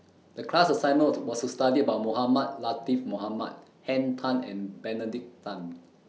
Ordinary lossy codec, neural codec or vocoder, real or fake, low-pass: none; none; real; none